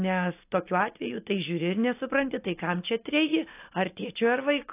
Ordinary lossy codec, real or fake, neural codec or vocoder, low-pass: AAC, 24 kbps; real; none; 3.6 kHz